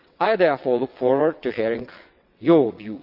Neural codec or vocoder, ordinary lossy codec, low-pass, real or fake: vocoder, 22.05 kHz, 80 mel bands, WaveNeXt; none; 5.4 kHz; fake